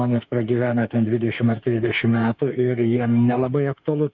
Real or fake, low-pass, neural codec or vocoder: fake; 7.2 kHz; autoencoder, 48 kHz, 32 numbers a frame, DAC-VAE, trained on Japanese speech